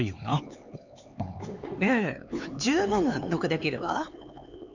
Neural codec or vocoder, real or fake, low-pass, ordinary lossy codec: codec, 16 kHz, 4 kbps, X-Codec, HuBERT features, trained on LibriSpeech; fake; 7.2 kHz; none